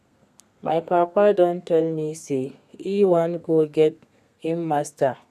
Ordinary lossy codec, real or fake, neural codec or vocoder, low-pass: none; fake; codec, 32 kHz, 1.9 kbps, SNAC; 14.4 kHz